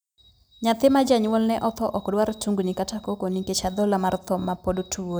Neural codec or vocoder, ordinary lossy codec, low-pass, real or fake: none; none; none; real